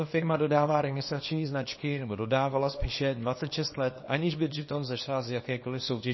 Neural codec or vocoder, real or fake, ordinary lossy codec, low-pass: codec, 24 kHz, 0.9 kbps, WavTokenizer, small release; fake; MP3, 24 kbps; 7.2 kHz